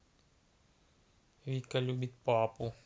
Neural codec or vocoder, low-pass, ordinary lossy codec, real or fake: none; none; none; real